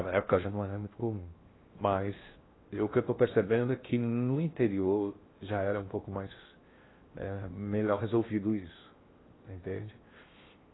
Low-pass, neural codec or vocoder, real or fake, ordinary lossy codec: 7.2 kHz; codec, 16 kHz in and 24 kHz out, 0.6 kbps, FocalCodec, streaming, 2048 codes; fake; AAC, 16 kbps